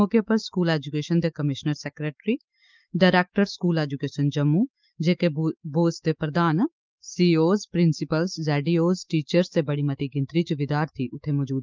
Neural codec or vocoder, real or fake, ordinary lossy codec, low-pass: autoencoder, 48 kHz, 128 numbers a frame, DAC-VAE, trained on Japanese speech; fake; Opus, 24 kbps; 7.2 kHz